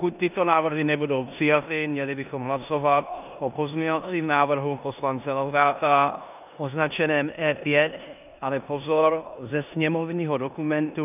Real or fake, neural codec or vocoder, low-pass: fake; codec, 16 kHz in and 24 kHz out, 0.9 kbps, LongCat-Audio-Codec, four codebook decoder; 3.6 kHz